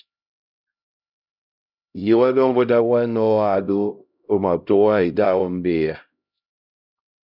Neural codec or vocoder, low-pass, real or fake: codec, 16 kHz, 0.5 kbps, X-Codec, HuBERT features, trained on LibriSpeech; 5.4 kHz; fake